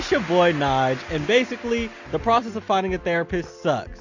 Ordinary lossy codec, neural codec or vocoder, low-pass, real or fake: AAC, 48 kbps; none; 7.2 kHz; real